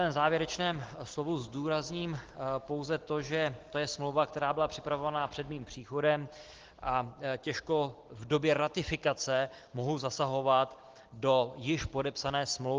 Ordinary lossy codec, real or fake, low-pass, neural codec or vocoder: Opus, 16 kbps; real; 7.2 kHz; none